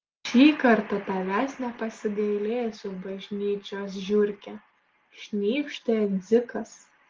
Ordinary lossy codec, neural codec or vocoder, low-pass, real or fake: Opus, 16 kbps; none; 7.2 kHz; real